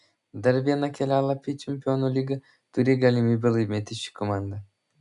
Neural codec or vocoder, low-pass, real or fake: none; 10.8 kHz; real